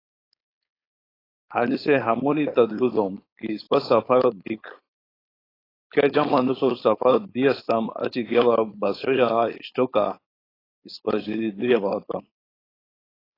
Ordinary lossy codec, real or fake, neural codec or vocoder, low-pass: AAC, 24 kbps; fake; codec, 16 kHz, 4.8 kbps, FACodec; 5.4 kHz